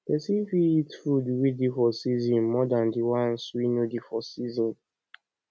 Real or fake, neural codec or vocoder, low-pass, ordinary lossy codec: real; none; none; none